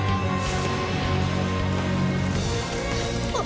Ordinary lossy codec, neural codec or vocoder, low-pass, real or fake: none; none; none; real